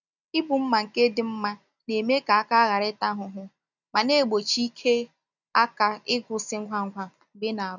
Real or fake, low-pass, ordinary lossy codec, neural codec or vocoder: real; 7.2 kHz; none; none